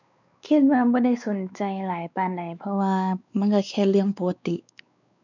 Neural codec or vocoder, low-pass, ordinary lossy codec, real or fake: codec, 16 kHz, 4 kbps, X-Codec, WavLM features, trained on Multilingual LibriSpeech; 7.2 kHz; none; fake